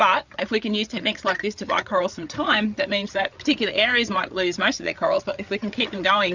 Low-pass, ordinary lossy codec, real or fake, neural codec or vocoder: 7.2 kHz; Opus, 64 kbps; fake; codec, 16 kHz, 8 kbps, FreqCodec, larger model